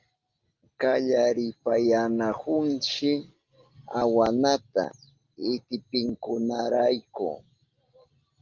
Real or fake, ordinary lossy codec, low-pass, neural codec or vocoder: real; Opus, 32 kbps; 7.2 kHz; none